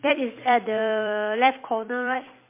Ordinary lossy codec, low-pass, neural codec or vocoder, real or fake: MP3, 24 kbps; 3.6 kHz; vocoder, 44.1 kHz, 128 mel bands every 256 samples, BigVGAN v2; fake